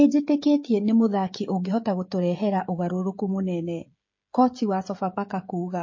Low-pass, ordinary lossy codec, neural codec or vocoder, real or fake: 7.2 kHz; MP3, 32 kbps; codec, 16 kHz, 16 kbps, FreqCodec, smaller model; fake